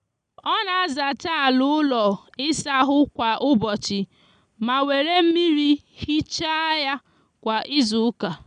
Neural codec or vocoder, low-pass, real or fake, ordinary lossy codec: none; 9.9 kHz; real; none